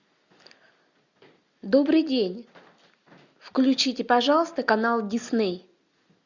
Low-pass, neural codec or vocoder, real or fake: 7.2 kHz; none; real